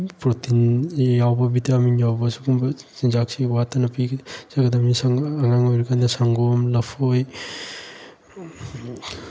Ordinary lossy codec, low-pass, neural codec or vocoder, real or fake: none; none; none; real